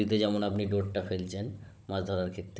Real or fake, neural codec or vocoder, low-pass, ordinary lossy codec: fake; codec, 16 kHz, 16 kbps, FunCodec, trained on Chinese and English, 50 frames a second; none; none